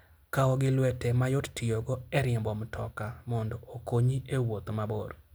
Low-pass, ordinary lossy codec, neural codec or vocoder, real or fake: none; none; none; real